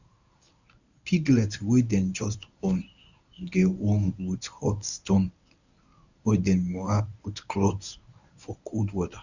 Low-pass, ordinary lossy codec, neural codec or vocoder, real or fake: 7.2 kHz; none; codec, 24 kHz, 0.9 kbps, WavTokenizer, medium speech release version 1; fake